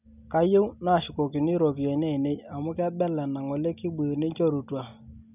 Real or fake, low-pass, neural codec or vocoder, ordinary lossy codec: real; 3.6 kHz; none; none